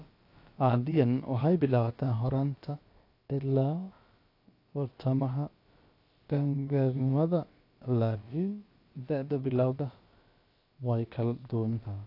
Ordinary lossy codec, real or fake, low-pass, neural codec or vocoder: AAC, 32 kbps; fake; 5.4 kHz; codec, 16 kHz, about 1 kbps, DyCAST, with the encoder's durations